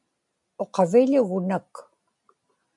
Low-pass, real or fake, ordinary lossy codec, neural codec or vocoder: 10.8 kHz; real; MP3, 64 kbps; none